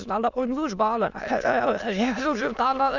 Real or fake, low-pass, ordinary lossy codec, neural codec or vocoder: fake; 7.2 kHz; none; autoencoder, 22.05 kHz, a latent of 192 numbers a frame, VITS, trained on many speakers